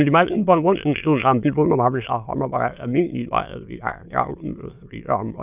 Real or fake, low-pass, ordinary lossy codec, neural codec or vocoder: fake; 3.6 kHz; none; autoencoder, 22.05 kHz, a latent of 192 numbers a frame, VITS, trained on many speakers